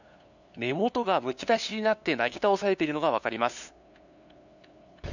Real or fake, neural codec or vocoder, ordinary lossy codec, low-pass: fake; codec, 16 kHz, 2 kbps, FunCodec, trained on LibriTTS, 25 frames a second; none; 7.2 kHz